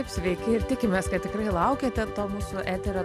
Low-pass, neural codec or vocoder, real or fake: 14.4 kHz; none; real